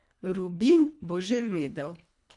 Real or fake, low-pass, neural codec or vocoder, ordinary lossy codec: fake; 10.8 kHz; codec, 24 kHz, 1.5 kbps, HILCodec; AAC, 64 kbps